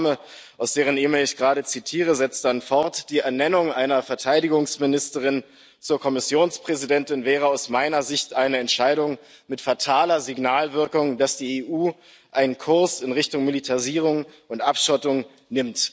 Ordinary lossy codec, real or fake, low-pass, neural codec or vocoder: none; real; none; none